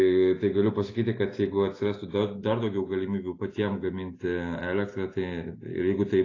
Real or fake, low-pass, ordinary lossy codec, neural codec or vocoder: real; 7.2 kHz; AAC, 32 kbps; none